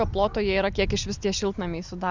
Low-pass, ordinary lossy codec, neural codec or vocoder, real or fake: 7.2 kHz; Opus, 64 kbps; none; real